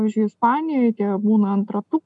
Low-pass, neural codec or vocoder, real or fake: 10.8 kHz; none; real